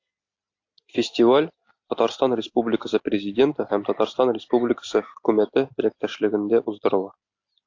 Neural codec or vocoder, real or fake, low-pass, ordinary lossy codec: none; real; 7.2 kHz; AAC, 48 kbps